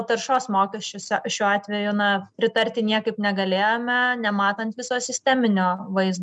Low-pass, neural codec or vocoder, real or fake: 9.9 kHz; none; real